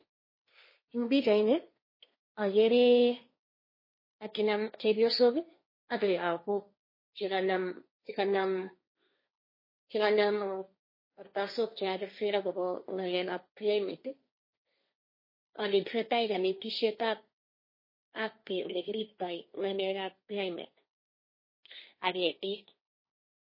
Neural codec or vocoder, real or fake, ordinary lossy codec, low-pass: codec, 16 kHz, 1.1 kbps, Voila-Tokenizer; fake; MP3, 24 kbps; 5.4 kHz